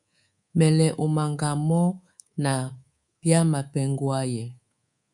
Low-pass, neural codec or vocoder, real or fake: 10.8 kHz; codec, 24 kHz, 3.1 kbps, DualCodec; fake